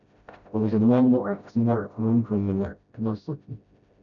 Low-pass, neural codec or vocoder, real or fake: 7.2 kHz; codec, 16 kHz, 0.5 kbps, FreqCodec, smaller model; fake